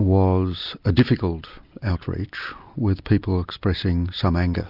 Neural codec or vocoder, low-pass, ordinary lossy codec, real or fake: none; 5.4 kHz; Opus, 64 kbps; real